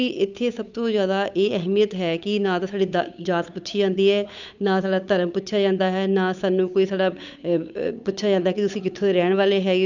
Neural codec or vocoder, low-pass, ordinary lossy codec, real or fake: codec, 16 kHz, 8 kbps, FunCodec, trained on Chinese and English, 25 frames a second; 7.2 kHz; none; fake